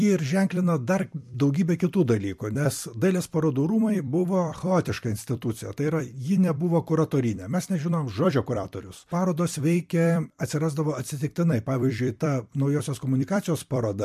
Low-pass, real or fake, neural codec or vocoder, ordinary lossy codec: 14.4 kHz; fake; vocoder, 44.1 kHz, 128 mel bands every 256 samples, BigVGAN v2; MP3, 64 kbps